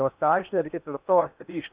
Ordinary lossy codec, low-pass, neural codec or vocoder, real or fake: Opus, 64 kbps; 3.6 kHz; codec, 16 kHz in and 24 kHz out, 0.8 kbps, FocalCodec, streaming, 65536 codes; fake